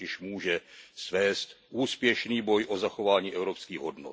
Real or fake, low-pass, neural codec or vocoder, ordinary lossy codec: real; none; none; none